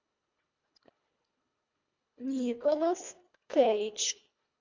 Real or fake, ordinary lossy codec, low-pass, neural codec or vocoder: fake; MP3, 64 kbps; 7.2 kHz; codec, 24 kHz, 1.5 kbps, HILCodec